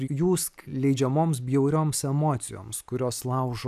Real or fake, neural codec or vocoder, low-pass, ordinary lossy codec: real; none; 14.4 kHz; AAC, 96 kbps